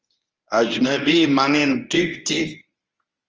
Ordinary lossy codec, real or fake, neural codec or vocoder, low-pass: Opus, 24 kbps; fake; codec, 24 kHz, 0.9 kbps, WavTokenizer, medium speech release version 1; 7.2 kHz